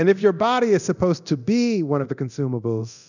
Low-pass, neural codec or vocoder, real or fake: 7.2 kHz; codec, 24 kHz, 0.9 kbps, DualCodec; fake